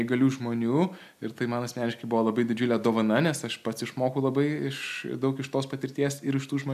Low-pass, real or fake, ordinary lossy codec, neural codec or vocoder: 14.4 kHz; real; AAC, 96 kbps; none